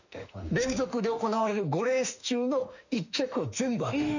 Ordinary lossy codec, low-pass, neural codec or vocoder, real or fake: none; 7.2 kHz; autoencoder, 48 kHz, 32 numbers a frame, DAC-VAE, trained on Japanese speech; fake